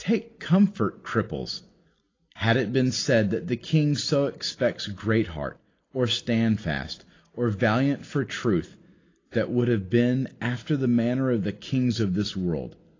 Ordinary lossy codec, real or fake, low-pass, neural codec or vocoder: AAC, 32 kbps; real; 7.2 kHz; none